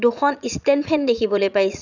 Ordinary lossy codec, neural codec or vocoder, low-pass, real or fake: none; vocoder, 44.1 kHz, 80 mel bands, Vocos; 7.2 kHz; fake